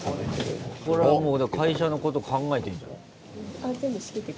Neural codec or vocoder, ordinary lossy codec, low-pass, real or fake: none; none; none; real